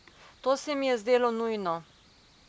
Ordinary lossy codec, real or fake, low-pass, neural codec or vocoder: none; real; none; none